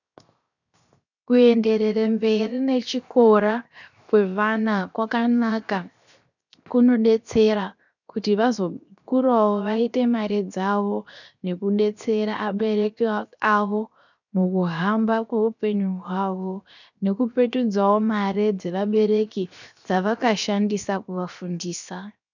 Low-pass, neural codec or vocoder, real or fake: 7.2 kHz; codec, 16 kHz, 0.7 kbps, FocalCodec; fake